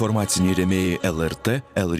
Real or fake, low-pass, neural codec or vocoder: fake; 14.4 kHz; vocoder, 44.1 kHz, 128 mel bands every 512 samples, BigVGAN v2